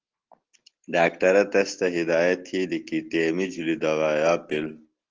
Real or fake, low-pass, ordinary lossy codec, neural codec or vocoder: real; 7.2 kHz; Opus, 16 kbps; none